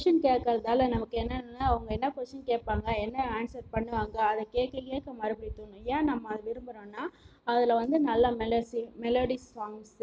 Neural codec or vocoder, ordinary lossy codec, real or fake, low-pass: none; none; real; none